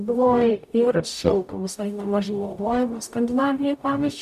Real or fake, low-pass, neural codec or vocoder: fake; 14.4 kHz; codec, 44.1 kHz, 0.9 kbps, DAC